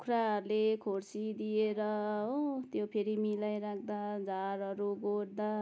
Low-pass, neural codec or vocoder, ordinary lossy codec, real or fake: none; none; none; real